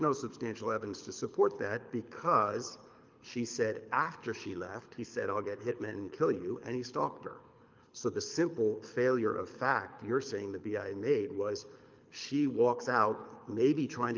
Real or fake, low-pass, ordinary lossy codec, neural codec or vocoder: fake; 7.2 kHz; Opus, 24 kbps; codec, 24 kHz, 6 kbps, HILCodec